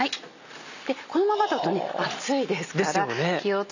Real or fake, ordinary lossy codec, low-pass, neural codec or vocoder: real; none; 7.2 kHz; none